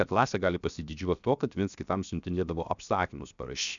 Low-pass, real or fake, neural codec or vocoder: 7.2 kHz; fake; codec, 16 kHz, 0.7 kbps, FocalCodec